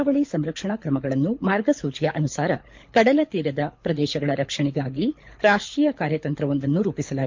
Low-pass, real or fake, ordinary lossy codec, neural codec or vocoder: 7.2 kHz; fake; MP3, 48 kbps; codec, 24 kHz, 6 kbps, HILCodec